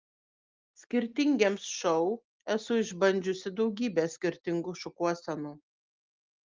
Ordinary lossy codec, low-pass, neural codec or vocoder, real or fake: Opus, 32 kbps; 7.2 kHz; none; real